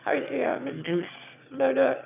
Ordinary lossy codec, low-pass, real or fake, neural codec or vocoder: none; 3.6 kHz; fake; autoencoder, 22.05 kHz, a latent of 192 numbers a frame, VITS, trained on one speaker